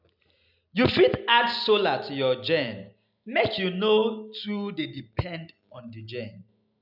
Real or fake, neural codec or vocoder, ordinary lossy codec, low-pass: real; none; none; 5.4 kHz